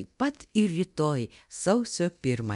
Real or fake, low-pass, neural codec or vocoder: fake; 10.8 kHz; codec, 24 kHz, 0.9 kbps, DualCodec